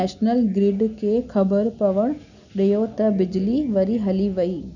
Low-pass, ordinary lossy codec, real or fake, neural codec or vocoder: 7.2 kHz; none; real; none